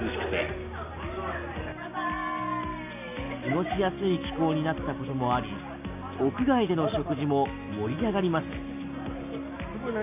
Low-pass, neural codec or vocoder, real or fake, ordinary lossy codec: 3.6 kHz; none; real; none